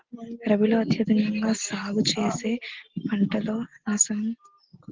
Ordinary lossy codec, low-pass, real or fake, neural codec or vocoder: Opus, 16 kbps; 7.2 kHz; real; none